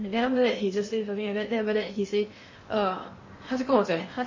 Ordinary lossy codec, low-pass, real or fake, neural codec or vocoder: MP3, 32 kbps; 7.2 kHz; fake; codec, 16 kHz in and 24 kHz out, 0.8 kbps, FocalCodec, streaming, 65536 codes